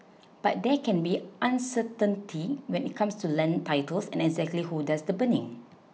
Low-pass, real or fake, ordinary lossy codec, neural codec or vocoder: none; real; none; none